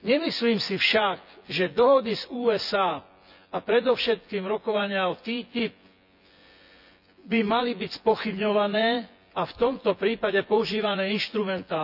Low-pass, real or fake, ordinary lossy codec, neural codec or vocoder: 5.4 kHz; fake; none; vocoder, 24 kHz, 100 mel bands, Vocos